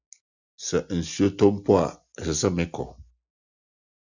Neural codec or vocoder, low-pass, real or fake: none; 7.2 kHz; real